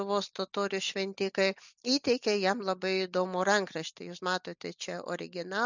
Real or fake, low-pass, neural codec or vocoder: real; 7.2 kHz; none